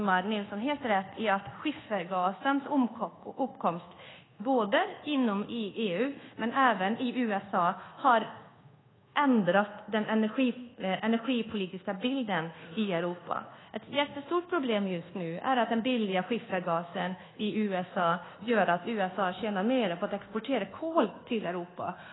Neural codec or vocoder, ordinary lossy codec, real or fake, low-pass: codec, 24 kHz, 1.2 kbps, DualCodec; AAC, 16 kbps; fake; 7.2 kHz